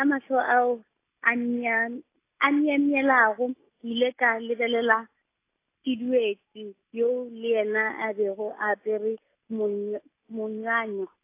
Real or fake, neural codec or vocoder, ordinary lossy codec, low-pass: real; none; AAC, 24 kbps; 3.6 kHz